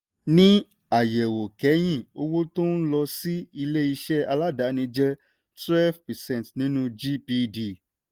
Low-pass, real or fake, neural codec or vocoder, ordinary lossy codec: 19.8 kHz; real; none; Opus, 32 kbps